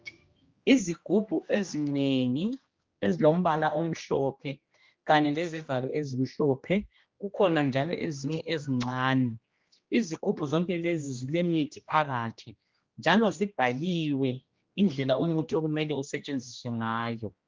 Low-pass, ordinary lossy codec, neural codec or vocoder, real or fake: 7.2 kHz; Opus, 32 kbps; codec, 16 kHz, 1 kbps, X-Codec, HuBERT features, trained on general audio; fake